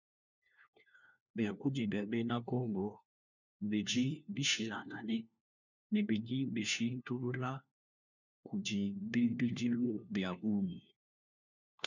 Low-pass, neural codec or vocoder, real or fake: 7.2 kHz; codec, 16 kHz, 1 kbps, FunCodec, trained on LibriTTS, 50 frames a second; fake